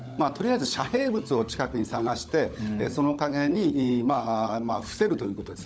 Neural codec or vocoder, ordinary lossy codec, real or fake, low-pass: codec, 16 kHz, 16 kbps, FunCodec, trained on LibriTTS, 50 frames a second; none; fake; none